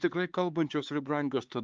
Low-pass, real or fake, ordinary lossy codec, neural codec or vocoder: 7.2 kHz; fake; Opus, 24 kbps; codec, 16 kHz, 4 kbps, X-Codec, HuBERT features, trained on LibriSpeech